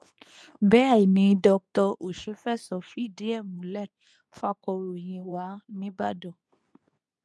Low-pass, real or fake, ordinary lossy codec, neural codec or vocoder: none; fake; none; codec, 24 kHz, 0.9 kbps, WavTokenizer, medium speech release version 2